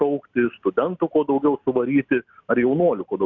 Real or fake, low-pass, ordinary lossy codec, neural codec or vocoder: real; 7.2 kHz; MP3, 64 kbps; none